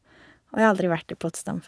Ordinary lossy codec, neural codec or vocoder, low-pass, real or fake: none; vocoder, 22.05 kHz, 80 mel bands, Vocos; none; fake